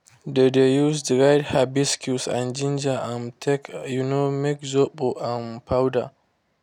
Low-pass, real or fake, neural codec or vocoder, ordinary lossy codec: 19.8 kHz; real; none; none